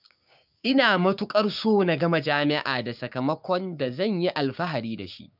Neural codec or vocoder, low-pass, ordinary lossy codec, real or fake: codec, 16 kHz, 6 kbps, DAC; 5.4 kHz; none; fake